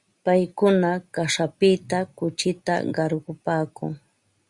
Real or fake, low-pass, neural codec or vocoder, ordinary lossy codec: real; 10.8 kHz; none; Opus, 64 kbps